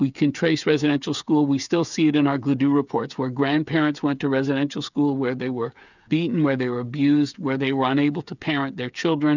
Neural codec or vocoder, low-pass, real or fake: codec, 16 kHz, 8 kbps, FreqCodec, smaller model; 7.2 kHz; fake